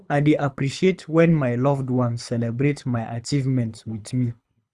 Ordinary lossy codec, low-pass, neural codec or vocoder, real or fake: none; none; codec, 24 kHz, 6 kbps, HILCodec; fake